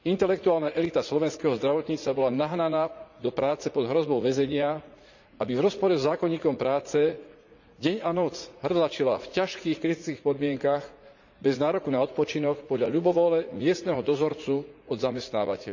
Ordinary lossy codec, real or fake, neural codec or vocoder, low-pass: none; fake; vocoder, 44.1 kHz, 80 mel bands, Vocos; 7.2 kHz